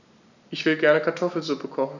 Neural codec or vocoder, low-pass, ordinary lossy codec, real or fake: none; 7.2 kHz; none; real